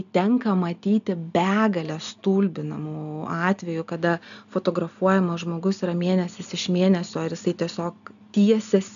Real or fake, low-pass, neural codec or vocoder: real; 7.2 kHz; none